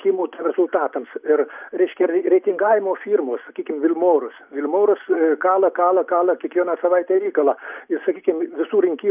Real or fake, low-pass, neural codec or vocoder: real; 3.6 kHz; none